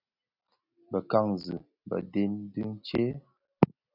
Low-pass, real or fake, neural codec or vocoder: 5.4 kHz; real; none